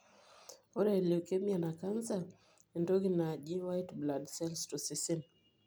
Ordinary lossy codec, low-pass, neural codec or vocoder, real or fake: none; none; none; real